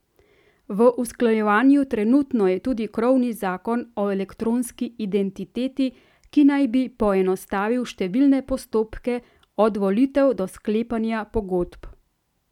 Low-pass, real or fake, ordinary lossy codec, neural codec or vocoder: 19.8 kHz; real; none; none